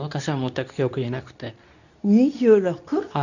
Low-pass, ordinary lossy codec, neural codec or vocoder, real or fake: 7.2 kHz; none; codec, 24 kHz, 0.9 kbps, WavTokenizer, medium speech release version 2; fake